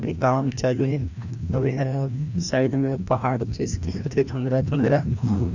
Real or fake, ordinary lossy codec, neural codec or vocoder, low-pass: fake; none; codec, 16 kHz, 1 kbps, FreqCodec, larger model; 7.2 kHz